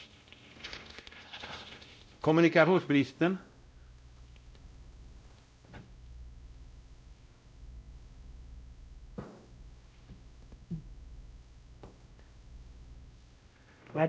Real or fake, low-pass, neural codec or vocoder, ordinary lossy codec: fake; none; codec, 16 kHz, 0.5 kbps, X-Codec, WavLM features, trained on Multilingual LibriSpeech; none